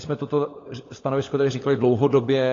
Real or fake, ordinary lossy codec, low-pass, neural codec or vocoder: fake; AAC, 32 kbps; 7.2 kHz; codec, 16 kHz, 16 kbps, FunCodec, trained on LibriTTS, 50 frames a second